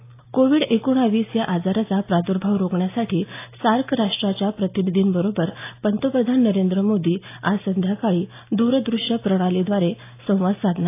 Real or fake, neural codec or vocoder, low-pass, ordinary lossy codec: real; none; 3.6 kHz; AAC, 24 kbps